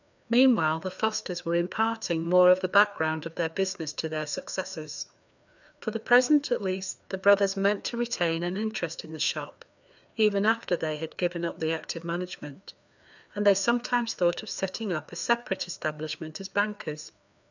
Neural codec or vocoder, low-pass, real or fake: codec, 16 kHz, 2 kbps, FreqCodec, larger model; 7.2 kHz; fake